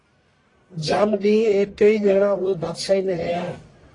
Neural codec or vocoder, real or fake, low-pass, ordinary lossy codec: codec, 44.1 kHz, 1.7 kbps, Pupu-Codec; fake; 10.8 kHz; AAC, 32 kbps